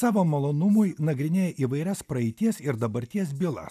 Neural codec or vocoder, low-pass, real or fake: none; 14.4 kHz; real